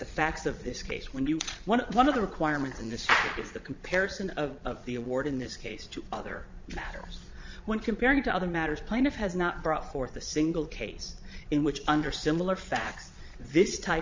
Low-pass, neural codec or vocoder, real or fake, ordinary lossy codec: 7.2 kHz; vocoder, 22.05 kHz, 80 mel bands, Vocos; fake; MP3, 64 kbps